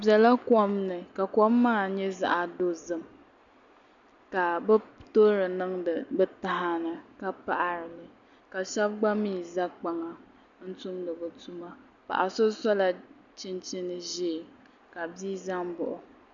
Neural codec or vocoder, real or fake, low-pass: none; real; 7.2 kHz